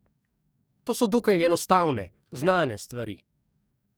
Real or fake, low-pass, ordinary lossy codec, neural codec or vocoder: fake; none; none; codec, 44.1 kHz, 2.6 kbps, DAC